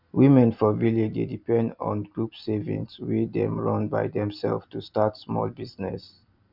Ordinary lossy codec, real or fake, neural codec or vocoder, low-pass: none; real; none; 5.4 kHz